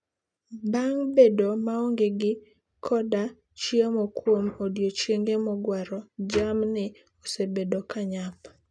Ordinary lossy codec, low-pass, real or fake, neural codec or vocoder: none; none; real; none